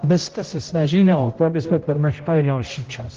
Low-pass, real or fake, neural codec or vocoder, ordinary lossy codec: 7.2 kHz; fake; codec, 16 kHz, 0.5 kbps, X-Codec, HuBERT features, trained on general audio; Opus, 16 kbps